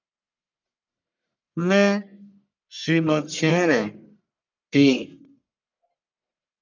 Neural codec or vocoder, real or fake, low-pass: codec, 44.1 kHz, 1.7 kbps, Pupu-Codec; fake; 7.2 kHz